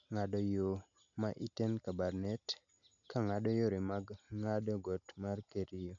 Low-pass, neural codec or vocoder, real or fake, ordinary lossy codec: 7.2 kHz; none; real; none